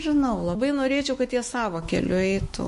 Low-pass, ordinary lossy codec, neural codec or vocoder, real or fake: 14.4 kHz; MP3, 48 kbps; autoencoder, 48 kHz, 128 numbers a frame, DAC-VAE, trained on Japanese speech; fake